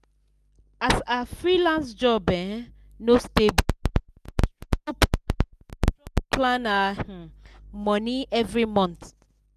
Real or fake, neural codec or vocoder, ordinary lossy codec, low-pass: real; none; none; 14.4 kHz